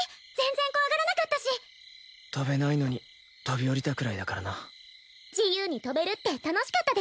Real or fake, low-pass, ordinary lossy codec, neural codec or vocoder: real; none; none; none